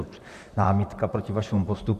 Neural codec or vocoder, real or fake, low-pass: vocoder, 44.1 kHz, 128 mel bands, Pupu-Vocoder; fake; 14.4 kHz